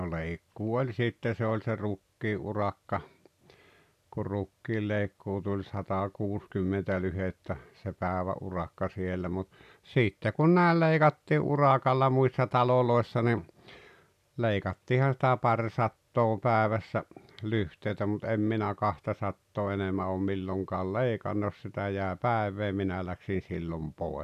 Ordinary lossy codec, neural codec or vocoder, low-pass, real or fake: none; none; 14.4 kHz; real